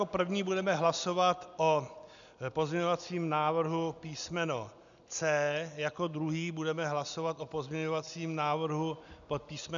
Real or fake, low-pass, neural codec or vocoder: real; 7.2 kHz; none